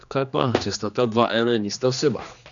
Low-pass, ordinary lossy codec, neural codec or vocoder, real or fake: 7.2 kHz; none; codec, 16 kHz, 2 kbps, X-Codec, HuBERT features, trained on general audio; fake